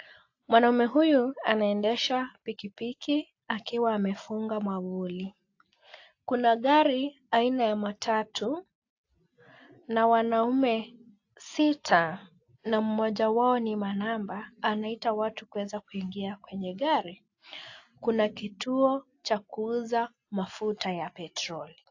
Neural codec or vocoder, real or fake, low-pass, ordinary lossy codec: none; real; 7.2 kHz; AAC, 48 kbps